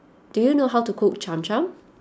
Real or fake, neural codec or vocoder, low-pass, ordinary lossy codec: real; none; none; none